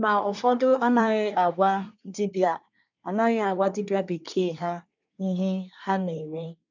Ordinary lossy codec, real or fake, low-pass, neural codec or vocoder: none; fake; 7.2 kHz; codec, 24 kHz, 1 kbps, SNAC